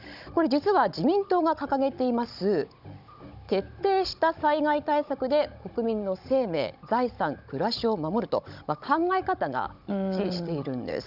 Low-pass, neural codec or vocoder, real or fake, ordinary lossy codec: 5.4 kHz; codec, 16 kHz, 16 kbps, FunCodec, trained on Chinese and English, 50 frames a second; fake; none